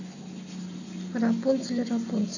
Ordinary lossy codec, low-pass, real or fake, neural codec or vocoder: none; 7.2 kHz; real; none